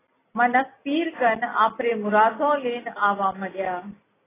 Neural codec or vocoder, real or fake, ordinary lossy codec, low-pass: none; real; AAC, 16 kbps; 3.6 kHz